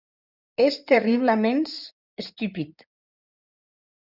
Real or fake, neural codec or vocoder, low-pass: fake; codec, 16 kHz in and 24 kHz out, 2.2 kbps, FireRedTTS-2 codec; 5.4 kHz